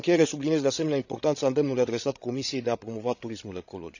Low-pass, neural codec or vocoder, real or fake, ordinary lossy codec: 7.2 kHz; codec, 16 kHz, 16 kbps, FreqCodec, larger model; fake; none